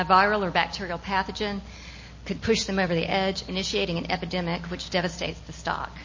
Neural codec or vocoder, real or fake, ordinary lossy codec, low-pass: none; real; MP3, 32 kbps; 7.2 kHz